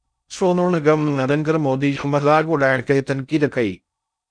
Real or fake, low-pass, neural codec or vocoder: fake; 9.9 kHz; codec, 16 kHz in and 24 kHz out, 0.6 kbps, FocalCodec, streaming, 4096 codes